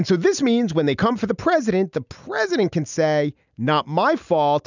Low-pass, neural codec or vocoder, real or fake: 7.2 kHz; none; real